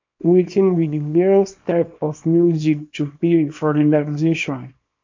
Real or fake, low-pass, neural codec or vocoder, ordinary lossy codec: fake; 7.2 kHz; codec, 24 kHz, 0.9 kbps, WavTokenizer, small release; MP3, 48 kbps